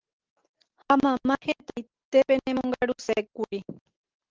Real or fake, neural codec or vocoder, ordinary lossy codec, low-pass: real; none; Opus, 16 kbps; 7.2 kHz